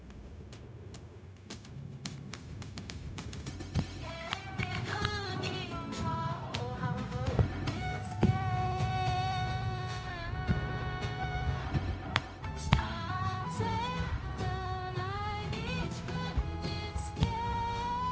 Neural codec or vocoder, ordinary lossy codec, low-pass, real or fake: codec, 16 kHz, 0.4 kbps, LongCat-Audio-Codec; none; none; fake